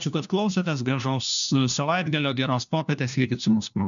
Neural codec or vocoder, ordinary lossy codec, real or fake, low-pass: codec, 16 kHz, 1 kbps, FunCodec, trained on LibriTTS, 50 frames a second; MP3, 96 kbps; fake; 7.2 kHz